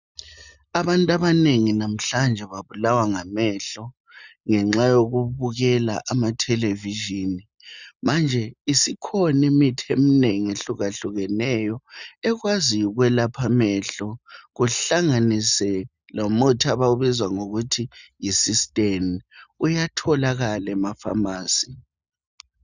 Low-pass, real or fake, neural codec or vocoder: 7.2 kHz; real; none